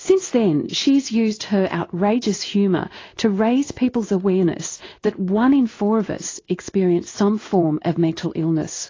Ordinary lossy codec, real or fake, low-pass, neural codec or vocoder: AAC, 32 kbps; fake; 7.2 kHz; codec, 16 kHz in and 24 kHz out, 1 kbps, XY-Tokenizer